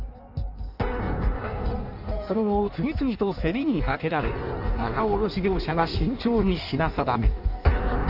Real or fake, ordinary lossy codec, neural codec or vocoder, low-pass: fake; none; codec, 16 kHz in and 24 kHz out, 1.1 kbps, FireRedTTS-2 codec; 5.4 kHz